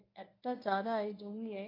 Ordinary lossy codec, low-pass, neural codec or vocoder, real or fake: AAC, 24 kbps; 5.4 kHz; codec, 24 kHz, 0.9 kbps, WavTokenizer, medium speech release version 1; fake